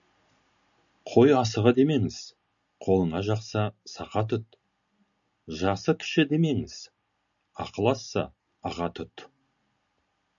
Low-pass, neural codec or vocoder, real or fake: 7.2 kHz; none; real